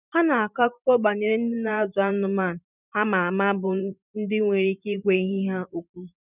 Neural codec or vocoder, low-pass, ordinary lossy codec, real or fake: vocoder, 44.1 kHz, 128 mel bands every 512 samples, BigVGAN v2; 3.6 kHz; none; fake